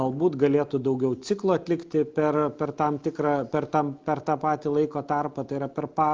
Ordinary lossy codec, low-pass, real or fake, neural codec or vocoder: Opus, 24 kbps; 7.2 kHz; real; none